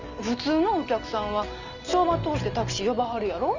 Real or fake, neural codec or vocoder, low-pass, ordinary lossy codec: real; none; 7.2 kHz; none